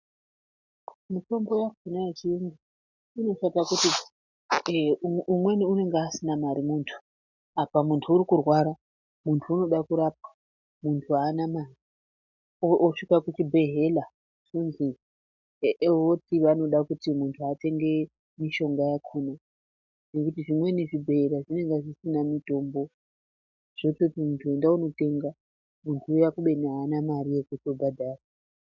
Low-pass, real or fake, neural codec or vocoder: 7.2 kHz; real; none